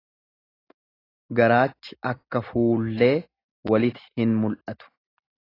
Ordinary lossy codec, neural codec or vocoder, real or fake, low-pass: AAC, 24 kbps; none; real; 5.4 kHz